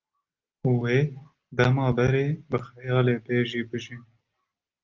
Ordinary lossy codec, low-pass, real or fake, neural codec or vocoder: Opus, 24 kbps; 7.2 kHz; real; none